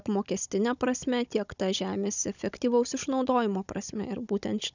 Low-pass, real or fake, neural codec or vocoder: 7.2 kHz; fake; codec, 16 kHz, 16 kbps, FunCodec, trained on Chinese and English, 50 frames a second